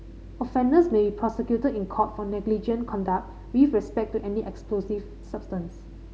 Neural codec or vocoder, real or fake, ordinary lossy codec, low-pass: none; real; none; none